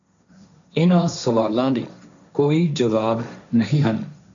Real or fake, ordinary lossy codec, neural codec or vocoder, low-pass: fake; MP3, 64 kbps; codec, 16 kHz, 1.1 kbps, Voila-Tokenizer; 7.2 kHz